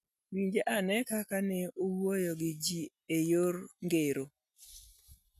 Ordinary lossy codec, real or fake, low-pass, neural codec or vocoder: none; real; 14.4 kHz; none